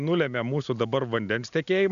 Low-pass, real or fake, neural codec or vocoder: 7.2 kHz; real; none